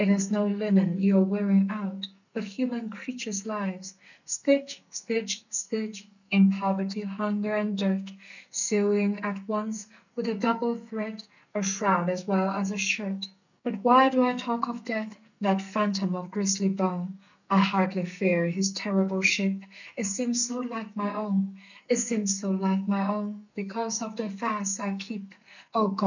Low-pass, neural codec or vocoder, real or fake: 7.2 kHz; codec, 44.1 kHz, 2.6 kbps, SNAC; fake